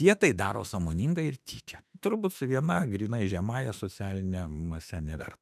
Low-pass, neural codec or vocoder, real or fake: 14.4 kHz; autoencoder, 48 kHz, 32 numbers a frame, DAC-VAE, trained on Japanese speech; fake